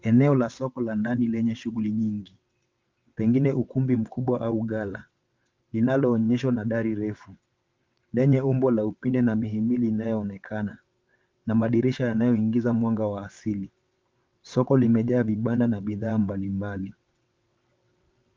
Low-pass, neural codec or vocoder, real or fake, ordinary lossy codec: 7.2 kHz; vocoder, 22.05 kHz, 80 mel bands, WaveNeXt; fake; Opus, 32 kbps